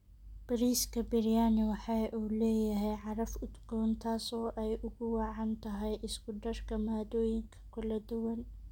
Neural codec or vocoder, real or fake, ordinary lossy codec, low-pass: none; real; none; 19.8 kHz